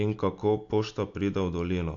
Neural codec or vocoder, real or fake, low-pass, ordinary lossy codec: none; real; 7.2 kHz; AAC, 64 kbps